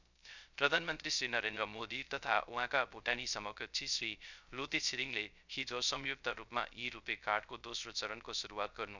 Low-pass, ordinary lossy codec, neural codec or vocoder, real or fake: 7.2 kHz; none; codec, 16 kHz, 0.3 kbps, FocalCodec; fake